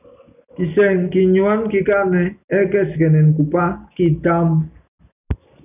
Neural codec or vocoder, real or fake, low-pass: none; real; 3.6 kHz